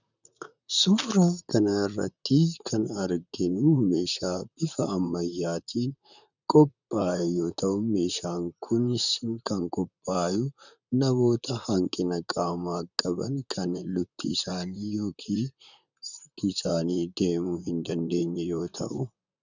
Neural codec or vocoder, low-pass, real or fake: vocoder, 44.1 kHz, 128 mel bands, Pupu-Vocoder; 7.2 kHz; fake